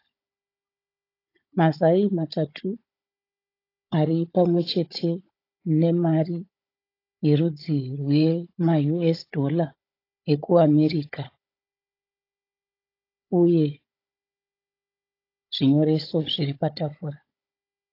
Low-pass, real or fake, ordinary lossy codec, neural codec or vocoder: 5.4 kHz; fake; AAC, 32 kbps; codec, 16 kHz, 16 kbps, FunCodec, trained on Chinese and English, 50 frames a second